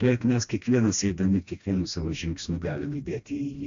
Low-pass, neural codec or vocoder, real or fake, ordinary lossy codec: 7.2 kHz; codec, 16 kHz, 1 kbps, FreqCodec, smaller model; fake; AAC, 64 kbps